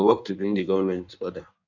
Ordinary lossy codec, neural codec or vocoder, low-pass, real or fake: none; codec, 16 kHz in and 24 kHz out, 1.1 kbps, FireRedTTS-2 codec; 7.2 kHz; fake